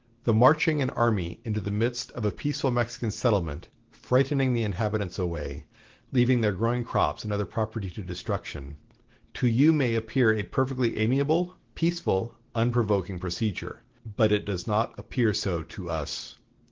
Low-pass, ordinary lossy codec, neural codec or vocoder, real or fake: 7.2 kHz; Opus, 16 kbps; none; real